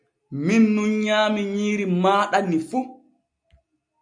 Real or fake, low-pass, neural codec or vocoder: real; 9.9 kHz; none